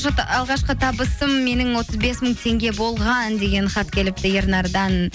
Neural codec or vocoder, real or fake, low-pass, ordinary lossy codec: none; real; none; none